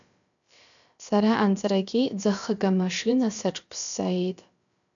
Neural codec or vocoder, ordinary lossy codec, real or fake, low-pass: codec, 16 kHz, about 1 kbps, DyCAST, with the encoder's durations; MP3, 96 kbps; fake; 7.2 kHz